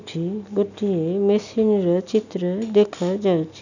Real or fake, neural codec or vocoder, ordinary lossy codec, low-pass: real; none; none; 7.2 kHz